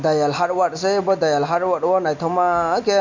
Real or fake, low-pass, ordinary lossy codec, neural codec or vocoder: real; 7.2 kHz; MP3, 48 kbps; none